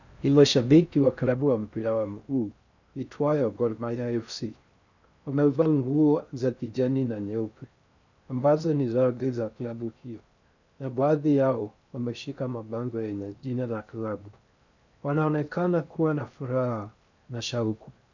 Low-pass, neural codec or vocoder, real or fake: 7.2 kHz; codec, 16 kHz in and 24 kHz out, 0.6 kbps, FocalCodec, streaming, 4096 codes; fake